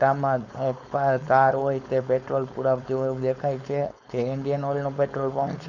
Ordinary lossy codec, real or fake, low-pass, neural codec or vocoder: none; fake; 7.2 kHz; codec, 16 kHz, 4.8 kbps, FACodec